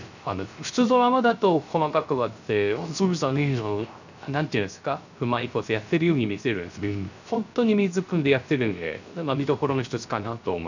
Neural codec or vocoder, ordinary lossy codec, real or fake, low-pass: codec, 16 kHz, 0.3 kbps, FocalCodec; none; fake; 7.2 kHz